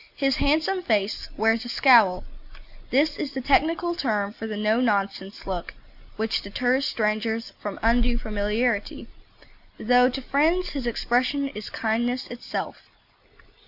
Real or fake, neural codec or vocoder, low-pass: real; none; 5.4 kHz